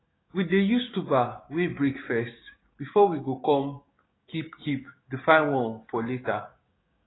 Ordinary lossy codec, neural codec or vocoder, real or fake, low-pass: AAC, 16 kbps; codec, 16 kHz, 6 kbps, DAC; fake; 7.2 kHz